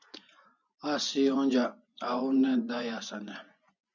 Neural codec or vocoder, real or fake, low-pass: vocoder, 24 kHz, 100 mel bands, Vocos; fake; 7.2 kHz